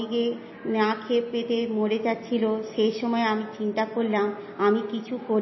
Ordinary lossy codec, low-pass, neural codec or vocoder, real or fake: MP3, 24 kbps; 7.2 kHz; none; real